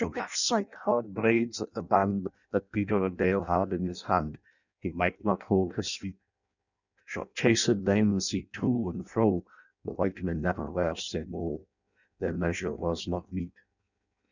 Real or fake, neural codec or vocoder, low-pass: fake; codec, 16 kHz in and 24 kHz out, 0.6 kbps, FireRedTTS-2 codec; 7.2 kHz